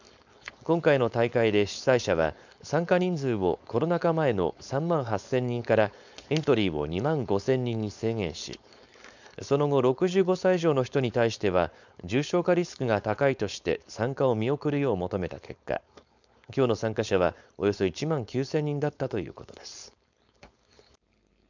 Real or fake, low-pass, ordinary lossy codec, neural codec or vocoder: fake; 7.2 kHz; none; codec, 16 kHz, 4.8 kbps, FACodec